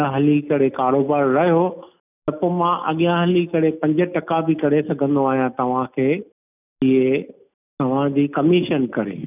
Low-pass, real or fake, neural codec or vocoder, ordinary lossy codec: 3.6 kHz; real; none; none